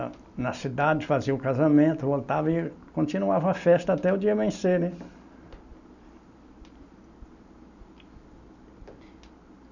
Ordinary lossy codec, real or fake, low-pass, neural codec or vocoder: none; real; 7.2 kHz; none